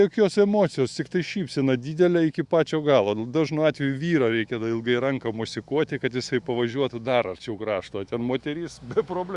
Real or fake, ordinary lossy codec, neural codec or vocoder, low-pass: fake; Opus, 64 kbps; autoencoder, 48 kHz, 128 numbers a frame, DAC-VAE, trained on Japanese speech; 10.8 kHz